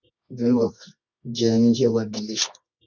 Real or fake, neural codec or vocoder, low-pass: fake; codec, 24 kHz, 0.9 kbps, WavTokenizer, medium music audio release; 7.2 kHz